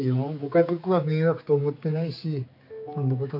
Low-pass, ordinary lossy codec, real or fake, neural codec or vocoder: 5.4 kHz; AAC, 48 kbps; fake; codec, 16 kHz, 4 kbps, X-Codec, HuBERT features, trained on general audio